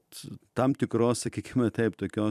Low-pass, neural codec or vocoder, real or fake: 14.4 kHz; vocoder, 44.1 kHz, 128 mel bands every 256 samples, BigVGAN v2; fake